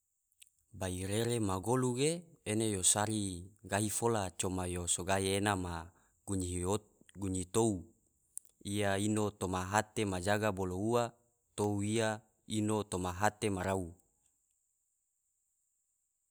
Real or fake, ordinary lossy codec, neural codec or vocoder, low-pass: real; none; none; none